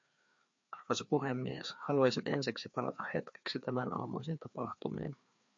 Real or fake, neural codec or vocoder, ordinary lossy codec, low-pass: fake; codec, 16 kHz, 2 kbps, FreqCodec, larger model; MP3, 48 kbps; 7.2 kHz